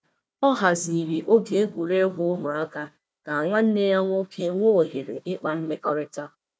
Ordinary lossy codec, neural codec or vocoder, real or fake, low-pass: none; codec, 16 kHz, 1 kbps, FunCodec, trained on Chinese and English, 50 frames a second; fake; none